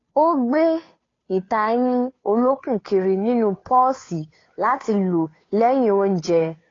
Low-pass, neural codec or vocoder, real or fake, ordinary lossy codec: 7.2 kHz; codec, 16 kHz, 2 kbps, FunCodec, trained on Chinese and English, 25 frames a second; fake; AAC, 32 kbps